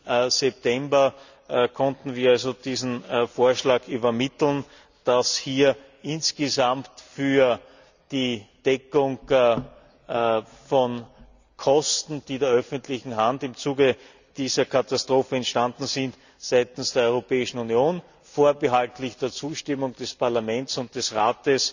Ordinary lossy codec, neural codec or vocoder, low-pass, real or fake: none; none; 7.2 kHz; real